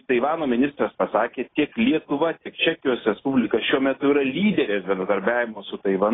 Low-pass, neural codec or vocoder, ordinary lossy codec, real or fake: 7.2 kHz; none; AAC, 16 kbps; real